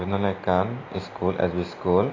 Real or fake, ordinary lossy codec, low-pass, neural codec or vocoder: real; AAC, 32 kbps; 7.2 kHz; none